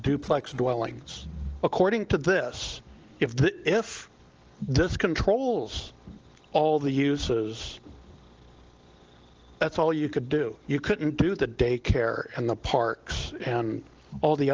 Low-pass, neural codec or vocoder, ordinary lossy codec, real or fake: 7.2 kHz; none; Opus, 16 kbps; real